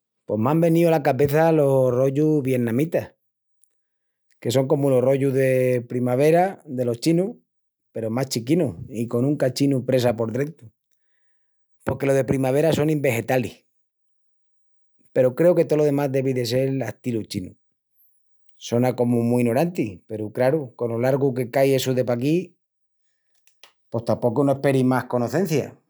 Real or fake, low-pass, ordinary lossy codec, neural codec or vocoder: real; none; none; none